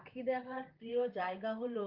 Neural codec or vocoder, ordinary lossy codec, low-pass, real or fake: vocoder, 44.1 kHz, 128 mel bands, Pupu-Vocoder; Opus, 24 kbps; 5.4 kHz; fake